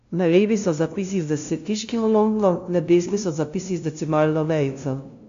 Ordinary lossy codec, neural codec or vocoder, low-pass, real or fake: AAC, 64 kbps; codec, 16 kHz, 0.5 kbps, FunCodec, trained on LibriTTS, 25 frames a second; 7.2 kHz; fake